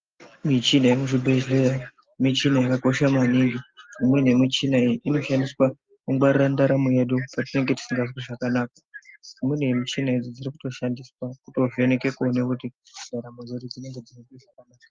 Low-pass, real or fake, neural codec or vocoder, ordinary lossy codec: 7.2 kHz; real; none; Opus, 32 kbps